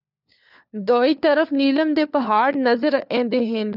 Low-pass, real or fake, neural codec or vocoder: 5.4 kHz; fake; codec, 16 kHz, 4 kbps, FunCodec, trained on LibriTTS, 50 frames a second